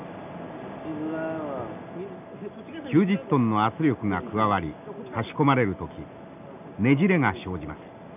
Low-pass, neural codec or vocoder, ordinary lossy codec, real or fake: 3.6 kHz; none; none; real